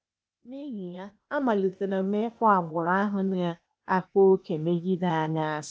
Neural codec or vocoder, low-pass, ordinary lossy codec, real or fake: codec, 16 kHz, 0.8 kbps, ZipCodec; none; none; fake